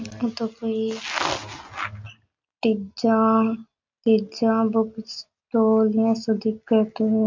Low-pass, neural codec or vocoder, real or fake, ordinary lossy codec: 7.2 kHz; none; real; MP3, 48 kbps